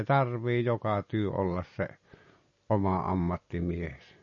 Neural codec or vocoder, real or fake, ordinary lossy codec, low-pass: none; real; MP3, 32 kbps; 7.2 kHz